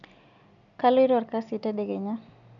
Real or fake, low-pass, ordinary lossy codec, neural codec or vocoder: real; 7.2 kHz; none; none